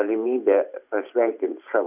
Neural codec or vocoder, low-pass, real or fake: vocoder, 44.1 kHz, 128 mel bands every 512 samples, BigVGAN v2; 3.6 kHz; fake